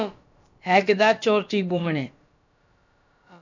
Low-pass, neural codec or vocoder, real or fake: 7.2 kHz; codec, 16 kHz, about 1 kbps, DyCAST, with the encoder's durations; fake